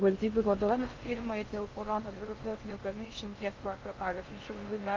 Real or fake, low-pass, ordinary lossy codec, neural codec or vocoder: fake; 7.2 kHz; Opus, 32 kbps; codec, 16 kHz in and 24 kHz out, 0.6 kbps, FocalCodec, streaming, 4096 codes